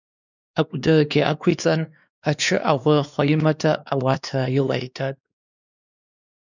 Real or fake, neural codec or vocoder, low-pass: fake; codec, 16 kHz, 2 kbps, X-Codec, WavLM features, trained on Multilingual LibriSpeech; 7.2 kHz